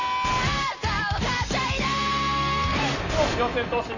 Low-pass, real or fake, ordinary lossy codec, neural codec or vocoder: 7.2 kHz; real; none; none